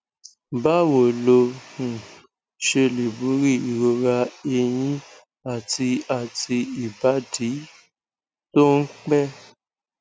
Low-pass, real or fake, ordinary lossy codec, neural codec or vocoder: none; real; none; none